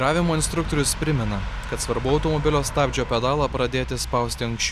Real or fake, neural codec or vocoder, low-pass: real; none; 14.4 kHz